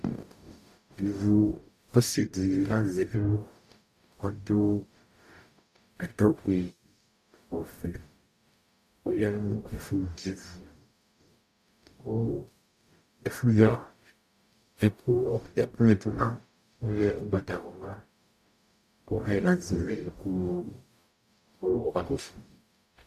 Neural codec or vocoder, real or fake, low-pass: codec, 44.1 kHz, 0.9 kbps, DAC; fake; 14.4 kHz